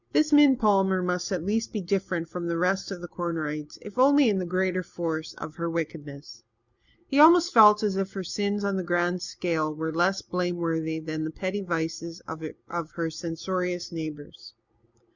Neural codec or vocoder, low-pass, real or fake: none; 7.2 kHz; real